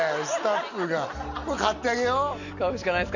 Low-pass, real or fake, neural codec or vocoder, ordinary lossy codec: 7.2 kHz; real; none; none